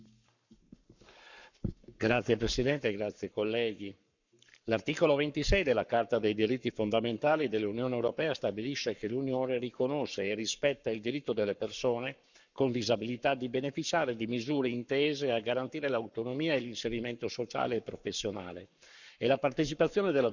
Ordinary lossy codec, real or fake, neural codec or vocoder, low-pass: none; fake; codec, 44.1 kHz, 7.8 kbps, Pupu-Codec; 7.2 kHz